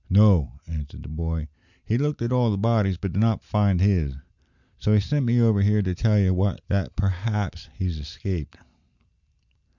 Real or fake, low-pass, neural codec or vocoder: real; 7.2 kHz; none